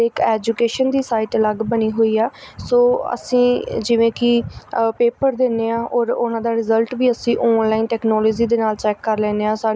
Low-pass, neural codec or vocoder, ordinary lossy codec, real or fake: none; none; none; real